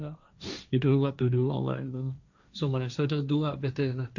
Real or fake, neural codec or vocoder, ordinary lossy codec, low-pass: fake; codec, 16 kHz, 1.1 kbps, Voila-Tokenizer; none; 7.2 kHz